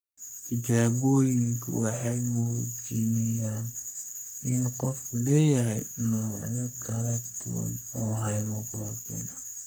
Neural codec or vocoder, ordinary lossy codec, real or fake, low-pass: codec, 44.1 kHz, 3.4 kbps, Pupu-Codec; none; fake; none